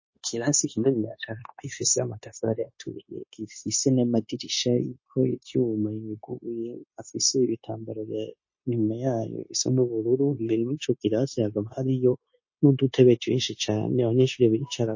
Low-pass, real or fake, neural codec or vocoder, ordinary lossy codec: 7.2 kHz; fake; codec, 16 kHz, 0.9 kbps, LongCat-Audio-Codec; MP3, 32 kbps